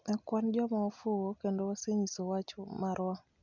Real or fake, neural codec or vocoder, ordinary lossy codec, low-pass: real; none; none; 7.2 kHz